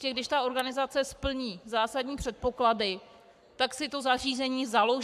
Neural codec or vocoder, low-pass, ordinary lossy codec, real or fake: codec, 44.1 kHz, 7.8 kbps, Pupu-Codec; 14.4 kHz; AAC, 96 kbps; fake